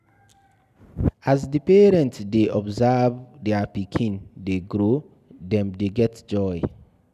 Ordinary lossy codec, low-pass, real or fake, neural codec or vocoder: none; 14.4 kHz; real; none